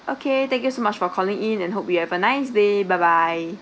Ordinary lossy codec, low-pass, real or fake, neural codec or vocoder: none; none; real; none